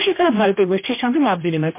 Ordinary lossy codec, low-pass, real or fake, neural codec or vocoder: MP3, 24 kbps; 3.6 kHz; fake; codec, 16 kHz, 1 kbps, X-Codec, HuBERT features, trained on general audio